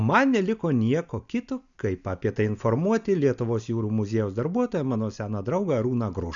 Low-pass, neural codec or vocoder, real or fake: 7.2 kHz; none; real